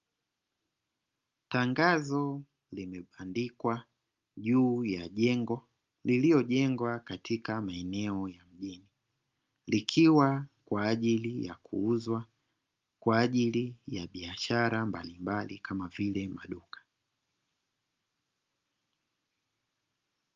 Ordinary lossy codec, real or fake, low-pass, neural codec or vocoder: Opus, 32 kbps; real; 7.2 kHz; none